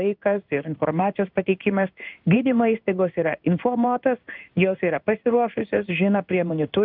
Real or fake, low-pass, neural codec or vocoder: fake; 5.4 kHz; codec, 16 kHz in and 24 kHz out, 1 kbps, XY-Tokenizer